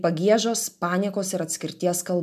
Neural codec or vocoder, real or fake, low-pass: none; real; 14.4 kHz